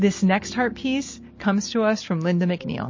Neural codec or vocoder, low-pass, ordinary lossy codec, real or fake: none; 7.2 kHz; MP3, 32 kbps; real